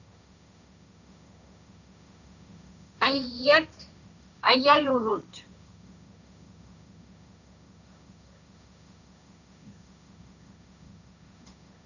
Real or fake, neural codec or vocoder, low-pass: fake; codec, 16 kHz, 1.1 kbps, Voila-Tokenizer; 7.2 kHz